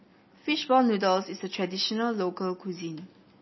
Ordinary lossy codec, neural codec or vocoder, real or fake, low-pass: MP3, 24 kbps; none; real; 7.2 kHz